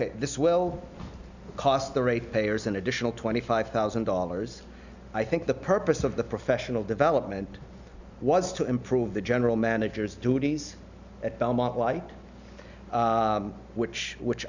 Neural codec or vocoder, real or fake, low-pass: none; real; 7.2 kHz